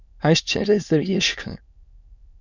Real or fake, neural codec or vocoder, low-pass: fake; autoencoder, 22.05 kHz, a latent of 192 numbers a frame, VITS, trained on many speakers; 7.2 kHz